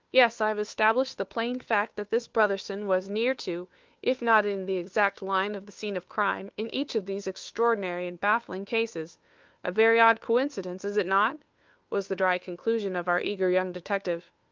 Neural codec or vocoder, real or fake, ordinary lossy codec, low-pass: codec, 16 kHz, 2 kbps, FunCodec, trained on Chinese and English, 25 frames a second; fake; Opus, 32 kbps; 7.2 kHz